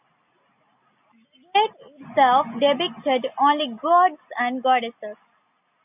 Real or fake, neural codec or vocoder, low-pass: real; none; 3.6 kHz